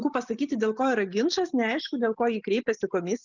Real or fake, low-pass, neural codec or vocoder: real; 7.2 kHz; none